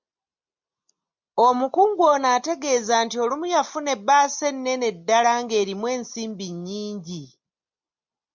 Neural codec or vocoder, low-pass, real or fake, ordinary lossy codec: none; 7.2 kHz; real; MP3, 64 kbps